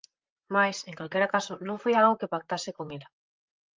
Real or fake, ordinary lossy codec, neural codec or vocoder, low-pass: fake; Opus, 32 kbps; vocoder, 44.1 kHz, 128 mel bands, Pupu-Vocoder; 7.2 kHz